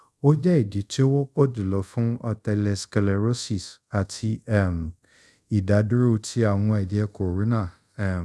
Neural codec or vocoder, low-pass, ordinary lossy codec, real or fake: codec, 24 kHz, 0.5 kbps, DualCodec; none; none; fake